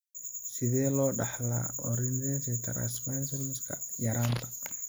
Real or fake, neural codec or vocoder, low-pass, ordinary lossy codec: real; none; none; none